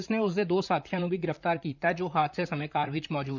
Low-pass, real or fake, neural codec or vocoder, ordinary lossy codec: 7.2 kHz; fake; vocoder, 44.1 kHz, 128 mel bands, Pupu-Vocoder; none